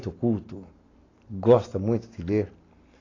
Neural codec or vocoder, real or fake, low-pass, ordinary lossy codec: none; real; 7.2 kHz; AAC, 32 kbps